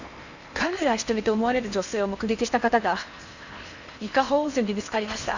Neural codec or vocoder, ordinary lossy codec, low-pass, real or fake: codec, 16 kHz in and 24 kHz out, 0.8 kbps, FocalCodec, streaming, 65536 codes; none; 7.2 kHz; fake